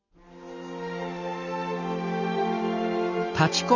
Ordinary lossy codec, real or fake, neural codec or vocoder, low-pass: none; real; none; 7.2 kHz